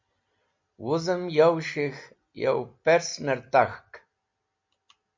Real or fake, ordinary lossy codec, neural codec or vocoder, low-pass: real; MP3, 48 kbps; none; 7.2 kHz